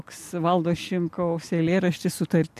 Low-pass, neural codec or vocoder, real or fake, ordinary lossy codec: 14.4 kHz; none; real; AAC, 96 kbps